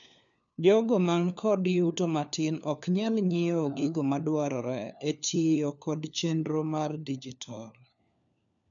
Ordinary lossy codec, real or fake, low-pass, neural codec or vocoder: none; fake; 7.2 kHz; codec, 16 kHz, 4 kbps, FunCodec, trained on LibriTTS, 50 frames a second